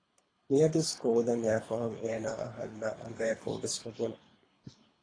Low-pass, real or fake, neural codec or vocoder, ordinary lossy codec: 9.9 kHz; fake; codec, 24 kHz, 3 kbps, HILCodec; AAC, 32 kbps